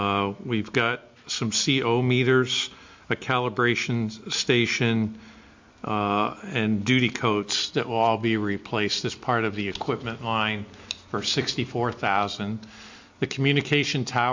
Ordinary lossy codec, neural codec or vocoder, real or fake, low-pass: MP3, 64 kbps; none; real; 7.2 kHz